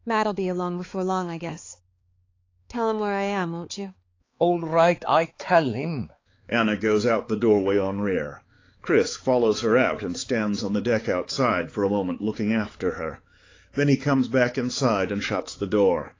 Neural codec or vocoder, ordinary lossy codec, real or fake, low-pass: codec, 16 kHz, 4 kbps, X-Codec, HuBERT features, trained on balanced general audio; AAC, 32 kbps; fake; 7.2 kHz